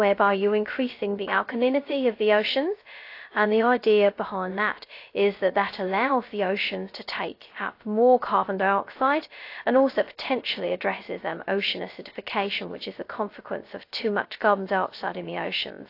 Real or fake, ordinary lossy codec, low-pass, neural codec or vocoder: fake; AAC, 32 kbps; 5.4 kHz; codec, 16 kHz, 0.2 kbps, FocalCodec